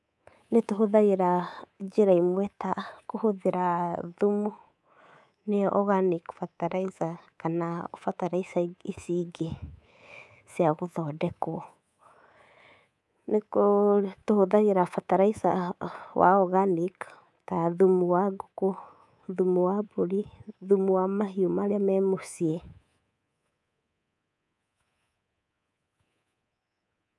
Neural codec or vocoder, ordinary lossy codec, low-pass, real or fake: codec, 24 kHz, 3.1 kbps, DualCodec; none; none; fake